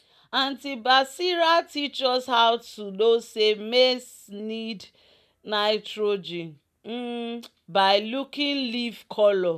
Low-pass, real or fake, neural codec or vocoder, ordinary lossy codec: 14.4 kHz; real; none; none